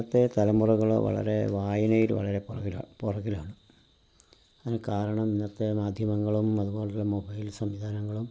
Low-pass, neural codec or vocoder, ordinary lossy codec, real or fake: none; none; none; real